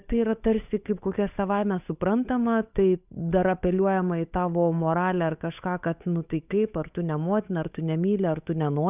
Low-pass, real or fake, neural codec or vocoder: 3.6 kHz; fake; codec, 16 kHz, 8 kbps, FunCodec, trained on Chinese and English, 25 frames a second